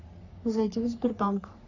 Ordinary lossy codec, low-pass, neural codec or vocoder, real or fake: none; 7.2 kHz; codec, 44.1 kHz, 3.4 kbps, Pupu-Codec; fake